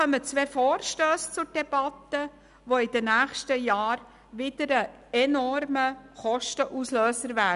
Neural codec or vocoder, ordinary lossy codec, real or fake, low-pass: none; none; real; 10.8 kHz